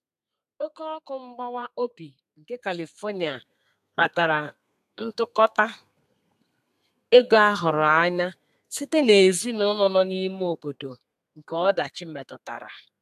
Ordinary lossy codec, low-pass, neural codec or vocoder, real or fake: AAC, 96 kbps; 14.4 kHz; codec, 32 kHz, 1.9 kbps, SNAC; fake